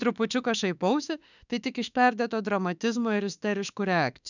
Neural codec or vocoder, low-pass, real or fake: autoencoder, 48 kHz, 32 numbers a frame, DAC-VAE, trained on Japanese speech; 7.2 kHz; fake